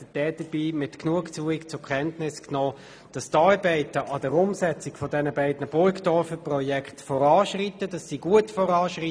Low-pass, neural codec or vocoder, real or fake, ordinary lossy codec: none; none; real; none